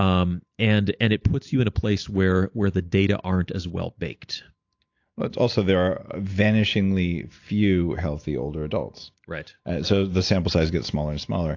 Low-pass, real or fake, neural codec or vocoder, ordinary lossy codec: 7.2 kHz; real; none; AAC, 48 kbps